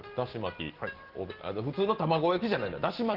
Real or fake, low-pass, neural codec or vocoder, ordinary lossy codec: real; 5.4 kHz; none; Opus, 16 kbps